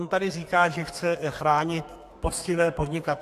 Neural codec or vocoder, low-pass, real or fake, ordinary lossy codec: codec, 32 kHz, 1.9 kbps, SNAC; 14.4 kHz; fake; AAC, 64 kbps